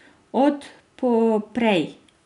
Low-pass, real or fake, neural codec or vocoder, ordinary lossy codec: 10.8 kHz; real; none; none